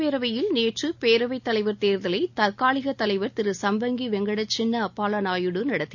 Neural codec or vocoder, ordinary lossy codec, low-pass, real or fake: none; none; 7.2 kHz; real